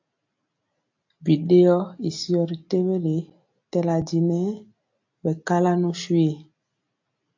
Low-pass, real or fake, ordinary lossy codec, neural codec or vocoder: 7.2 kHz; real; AAC, 48 kbps; none